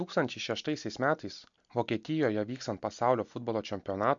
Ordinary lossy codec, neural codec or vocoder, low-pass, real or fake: MP3, 64 kbps; none; 7.2 kHz; real